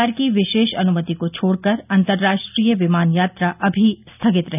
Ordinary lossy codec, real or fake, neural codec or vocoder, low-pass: none; real; none; 3.6 kHz